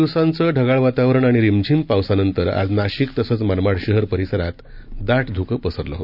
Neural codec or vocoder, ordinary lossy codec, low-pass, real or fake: none; none; 5.4 kHz; real